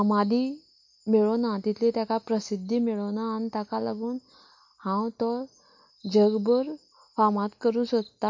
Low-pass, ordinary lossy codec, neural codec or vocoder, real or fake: 7.2 kHz; MP3, 48 kbps; none; real